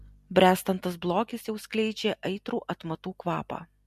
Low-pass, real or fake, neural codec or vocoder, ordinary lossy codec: 14.4 kHz; real; none; MP3, 64 kbps